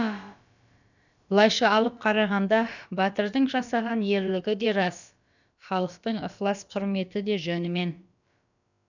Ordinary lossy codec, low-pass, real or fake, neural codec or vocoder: none; 7.2 kHz; fake; codec, 16 kHz, about 1 kbps, DyCAST, with the encoder's durations